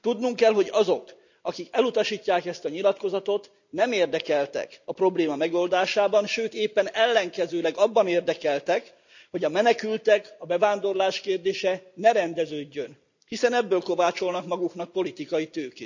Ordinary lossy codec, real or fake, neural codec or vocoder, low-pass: none; real; none; 7.2 kHz